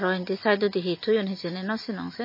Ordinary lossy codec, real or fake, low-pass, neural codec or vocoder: MP3, 24 kbps; real; 5.4 kHz; none